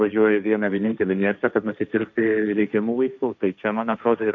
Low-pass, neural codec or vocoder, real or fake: 7.2 kHz; codec, 16 kHz, 1.1 kbps, Voila-Tokenizer; fake